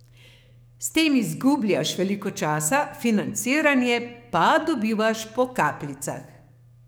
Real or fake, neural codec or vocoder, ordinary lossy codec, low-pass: fake; codec, 44.1 kHz, 7.8 kbps, DAC; none; none